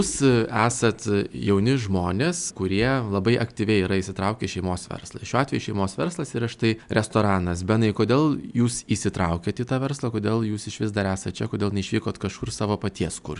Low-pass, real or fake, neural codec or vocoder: 10.8 kHz; real; none